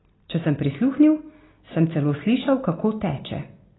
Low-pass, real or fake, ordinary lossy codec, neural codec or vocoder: 7.2 kHz; real; AAC, 16 kbps; none